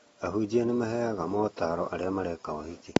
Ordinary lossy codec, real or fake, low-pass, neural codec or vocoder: AAC, 24 kbps; real; 19.8 kHz; none